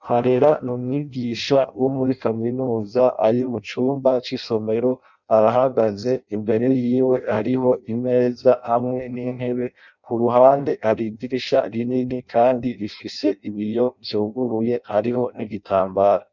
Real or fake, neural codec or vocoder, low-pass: fake; codec, 16 kHz in and 24 kHz out, 0.6 kbps, FireRedTTS-2 codec; 7.2 kHz